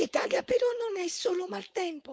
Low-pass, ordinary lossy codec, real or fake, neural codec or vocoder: none; none; fake; codec, 16 kHz, 4.8 kbps, FACodec